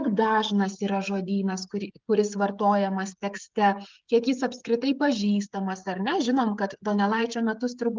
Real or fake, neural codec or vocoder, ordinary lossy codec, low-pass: fake; codec, 16 kHz, 16 kbps, FreqCodec, smaller model; Opus, 32 kbps; 7.2 kHz